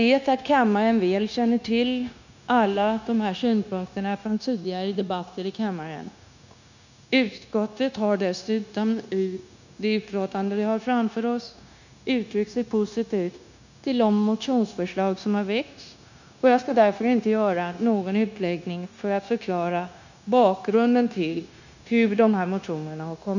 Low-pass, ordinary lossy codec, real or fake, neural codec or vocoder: 7.2 kHz; none; fake; codec, 16 kHz, 0.9 kbps, LongCat-Audio-Codec